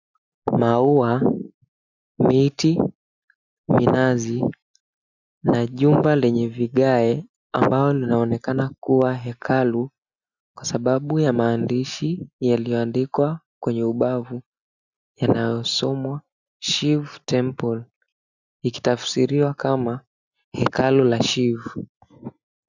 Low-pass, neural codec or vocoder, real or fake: 7.2 kHz; none; real